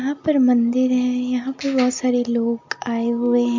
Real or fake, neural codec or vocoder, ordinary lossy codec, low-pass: real; none; MP3, 48 kbps; 7.2 kHz